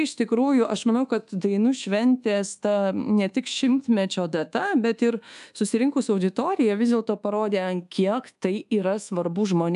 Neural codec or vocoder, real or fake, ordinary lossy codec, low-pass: codec, 24 kHz, 1.2 kbps, DualCodec; fake; AAC, 96 kbps; 10.8 kHz